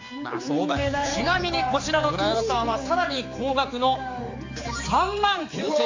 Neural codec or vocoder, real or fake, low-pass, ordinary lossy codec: codec, 16 kHz, 4 kbps, X-Codec, HuBERT features, trained on general audio; fake; 7.2 kHz; AAC, 48 kbps